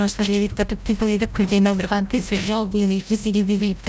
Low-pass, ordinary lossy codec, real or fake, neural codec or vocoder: none; none; fake; codec, 16 kHz, 0.5 kbps, FreqCodec, larger model